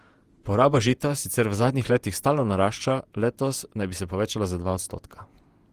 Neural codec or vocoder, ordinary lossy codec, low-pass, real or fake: vocoder, 48 kHz, 128 mel bands, Vocos; Opus, 24 kbps; 14.4 kHz; fake